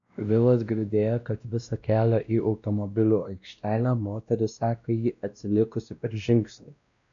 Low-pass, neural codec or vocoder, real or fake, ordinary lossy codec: 7.2 kHz; codec, 16 kHz, 1 kbps, X-Codec, WavLM features, trained on Multilingual LibriSpeech; fake; AAC, 64 kbps